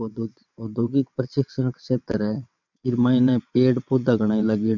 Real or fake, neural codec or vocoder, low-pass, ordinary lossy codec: fake; vocoder, 22.05 kHz, 80 mel bands, WaveNeXt; 7.2 kHz; none